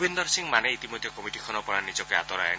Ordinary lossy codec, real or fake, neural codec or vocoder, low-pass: none; real; none; none